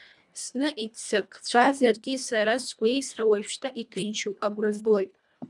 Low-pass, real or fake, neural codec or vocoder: 10.8 kHz; fake; codec, 24 kHz, 1.5 kbps, HILCodec